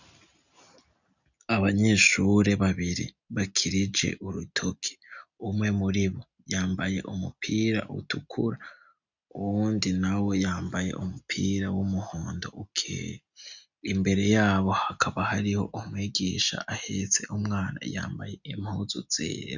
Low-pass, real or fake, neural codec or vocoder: 7.2 kHz; real; none